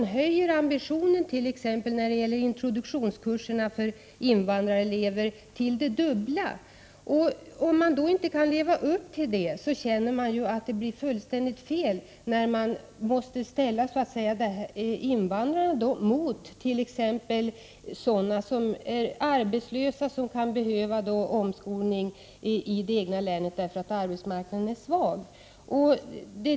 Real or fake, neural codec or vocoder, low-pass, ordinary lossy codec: real; none; none; none